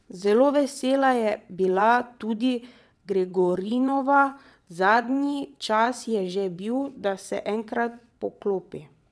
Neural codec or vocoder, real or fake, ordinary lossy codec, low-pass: vocoder, 22.05 kHz, 80 mel bands, WaveNeXt; fake; none; none